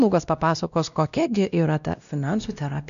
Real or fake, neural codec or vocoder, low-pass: fake; codec, 16 kHz, 1 kbps, X-Codec, WavLM features, trained on Multilingual LibriSpeech; 7.2 kHz